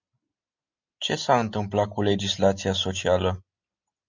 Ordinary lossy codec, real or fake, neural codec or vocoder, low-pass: MP3, 64 kbps; real; none; 7.2 kHz